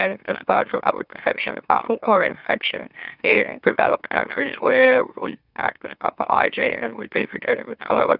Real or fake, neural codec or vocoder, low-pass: fake; autoencoder, 44.1 kHz, a latent of 192 numbers a frame, MeloTTS; 5.4 kHz